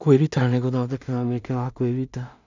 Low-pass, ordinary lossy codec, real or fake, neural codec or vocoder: 7.2 kHz; none; fake; codec, 16 kHz in and 24 kHz out, 0.4 kbps, LongCat-Audio-Codec, two codebook decoder